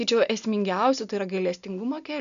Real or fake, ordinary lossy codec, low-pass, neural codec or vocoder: real; MP3, 96 kbps; 7.2 kHz; none